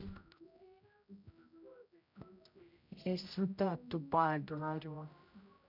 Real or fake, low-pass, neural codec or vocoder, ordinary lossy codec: fake; 5.4 kHz; codec, 16 kHz, 0.5 kbps, X-Codec, HuBERT features, trained on general audio; none